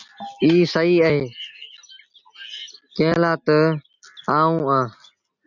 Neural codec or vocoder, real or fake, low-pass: none; real; 7.2 kHz